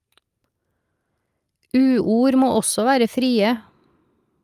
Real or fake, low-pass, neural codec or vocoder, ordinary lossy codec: real; 14.4 kHz; none; Opus, 32 kbps